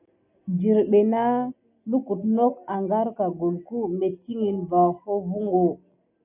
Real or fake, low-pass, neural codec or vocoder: real; 3.6 kHz; none